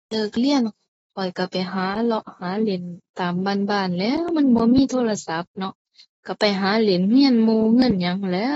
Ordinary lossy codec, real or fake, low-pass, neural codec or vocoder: AAC, 24 kbps; fake; 19.8 kHz; autoencoder, 48 kHz, 128 numbers a frame, DAC-VAE, trained on Japanese speech